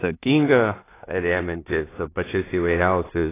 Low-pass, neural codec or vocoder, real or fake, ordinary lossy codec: 3.6 kHz; codec, 16 kHz in and 24 kHz out, 0.4 kbps, LongCat-Audio-Codec, two codebook decoder; fake; AAC, 16 kbps